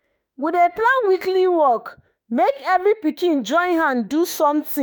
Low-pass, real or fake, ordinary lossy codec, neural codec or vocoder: none; fake; none; autoencoder, 48 kHz, 32 numbers a frame, DAC-VAE, trained on Japanese speech